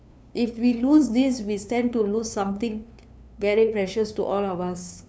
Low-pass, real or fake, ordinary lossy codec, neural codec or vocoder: none; fake; none; codec, 16 kHz, 2 kbps, FunCodec, trained on LibriTTS, 25 frames a second